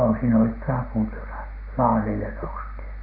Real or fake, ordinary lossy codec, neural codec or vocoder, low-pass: real; none; none; 5.4 kHz